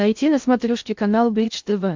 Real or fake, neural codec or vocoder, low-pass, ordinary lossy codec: fake; codec, 16 kHz in and 24 kHz out, 0.6 kbps, FocalCodec, streaming, 2048 codes; 7.2 kHz; MP3, 64 kbps